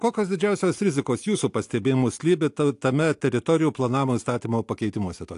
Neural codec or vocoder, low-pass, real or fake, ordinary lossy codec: none; 10.8 kHz; real; AAC, 64 kbps